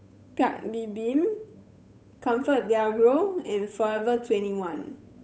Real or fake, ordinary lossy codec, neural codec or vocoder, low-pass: fake; none; codec, 16 kHz, 8 kbps, FunCodec, trained on Chinese and English, 25 frames a second; none